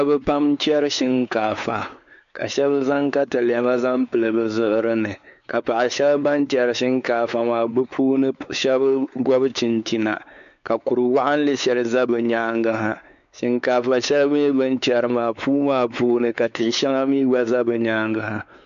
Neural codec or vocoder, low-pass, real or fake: codec, 16 kHz, 4 kbps, X-Codec, WavLM features, trained on Multilingual LibriSpeech; 7.2 kHz; fake